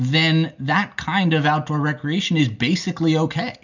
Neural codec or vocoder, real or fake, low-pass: none; real; 7.2 kHz